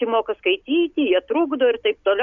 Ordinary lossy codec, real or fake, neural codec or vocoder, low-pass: MP3, 64 kbps; real; none; 7.2 kHz